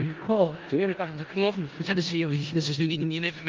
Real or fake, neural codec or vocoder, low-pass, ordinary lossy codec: fake; codec, 16 kHz in and 24 kHz out, 0.4 kbps, LongCat-Audio-Codec, four codebook decoder; 7.2 kHz; Opus, 32 kbps